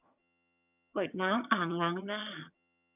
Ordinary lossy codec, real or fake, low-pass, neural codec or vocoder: none; fake; 3.6 kHz; vocoder, 22.05 kHz, 80 mel bands, HiFi-GAN